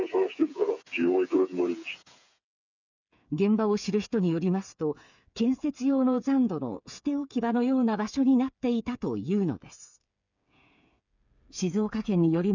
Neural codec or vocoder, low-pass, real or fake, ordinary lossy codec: codec, 16 kHz, 8 kbps, FreqCodec, smaller model; 7.2 kHz; fake; none